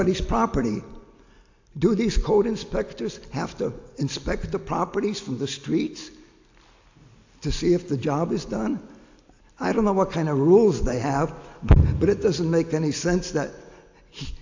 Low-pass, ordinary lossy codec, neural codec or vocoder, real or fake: 7.2 kHz; AAC, 48 kbps; none; real